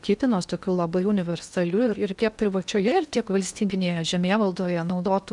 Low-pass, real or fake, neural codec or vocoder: 10.8 kHz; fake; codec, 16 kHz in and 24 kHz out, 0.8 kbps, FocalCodec, streaming, 65536 codes